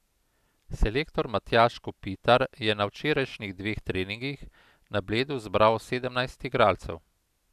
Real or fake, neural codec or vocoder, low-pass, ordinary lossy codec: real; none; 14.4 kHz; none